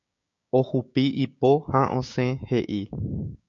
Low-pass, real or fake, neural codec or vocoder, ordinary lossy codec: 7.2 kHz; fake; codec, 16 kHz, 6 kbps, DAC; MP3, 64 kbps